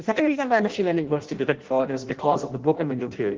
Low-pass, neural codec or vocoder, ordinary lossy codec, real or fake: 7.2 kHz; codec, 16 kHz in and 24 kHz out, 0.6 kbps, FireRedTTS-2 codec; Opus, 16 kbps; fake